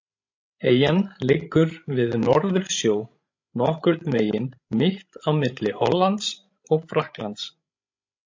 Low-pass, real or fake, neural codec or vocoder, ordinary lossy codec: 7.2 kHz; fake; codec, 16 kHz, 16 kbps, FreqCodec, larger model; MP3, 48 kbps